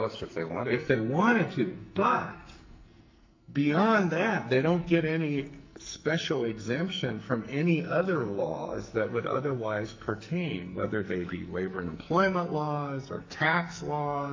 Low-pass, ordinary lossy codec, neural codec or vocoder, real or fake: 7.2 kHz; MP3, 48 kbps; codec, 44.1 kHz, 2.6 kbps, SNAC; fake